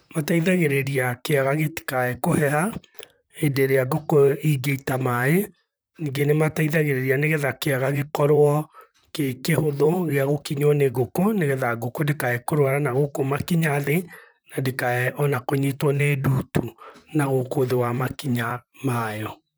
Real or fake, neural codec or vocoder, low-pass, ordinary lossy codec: fake; vocoder, 44.1 kHz, 128 mel bands, Pupu-Vocoder; none; none